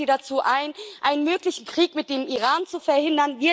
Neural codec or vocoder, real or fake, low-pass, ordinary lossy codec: none; real; none; none